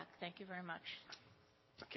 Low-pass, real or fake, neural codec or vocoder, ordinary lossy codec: 7.2 kHz; real; none; MP3, 24 kbps